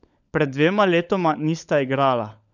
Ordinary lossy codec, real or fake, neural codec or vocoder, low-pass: none; fake; codec, 16 kHz, 16 kbps, FunCodec, trained on LibriTTS, 50 frames a second; 7.2 kHz